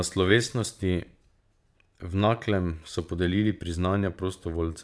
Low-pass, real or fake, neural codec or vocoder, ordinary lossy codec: none; fake; vocoder, 22.05 kHz, 80 mel bands, Vocos; none